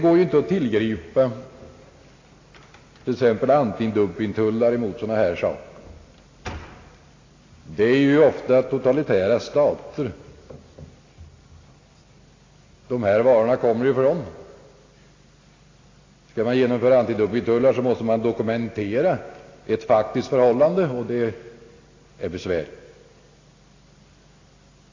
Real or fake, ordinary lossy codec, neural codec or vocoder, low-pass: real; AAC, 32 kbps; none; 7.2 kHz